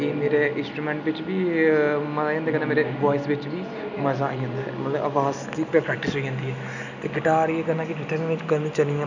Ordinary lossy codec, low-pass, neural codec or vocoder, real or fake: none; 7.2 kHz; none; real